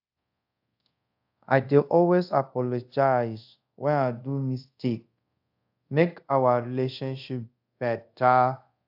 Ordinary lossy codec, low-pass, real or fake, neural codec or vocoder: AAC, 48 kbps; 5.4 kHz; fake; codec, 24 kHz, 0.5 kbps, DualCodec